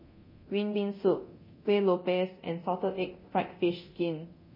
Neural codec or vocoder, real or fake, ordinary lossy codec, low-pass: codec, 24 kHz, 0.9 kbps, DualCodec; fake; MP3, 24 kbps; 5.4 kHz